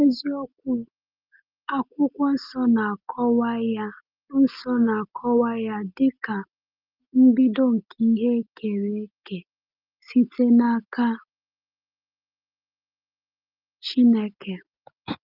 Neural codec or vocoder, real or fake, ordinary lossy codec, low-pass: none; real; Opus, 24 kbps; 5.4 kHz